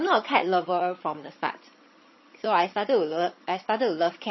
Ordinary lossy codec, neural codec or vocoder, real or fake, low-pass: MP3, 24 kbps; vocoder, 22.05 kHz, 80 mel bands, HiFi-GAN; fake; 7.2 kHz